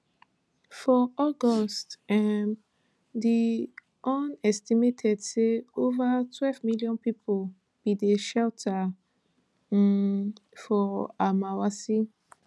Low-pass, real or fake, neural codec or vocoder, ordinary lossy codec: none; real; none; none